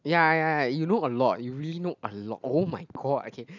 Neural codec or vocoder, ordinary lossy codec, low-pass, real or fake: none; none; 7.2 kHz; real